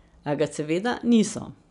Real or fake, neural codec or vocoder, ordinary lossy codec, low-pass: real; none; none; 10.8 kHz